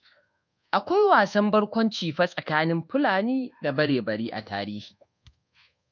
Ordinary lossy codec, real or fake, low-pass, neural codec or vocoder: none; fake; 7.2 kHz; codec, 24 kHz, 1.2 kbps, DualCodec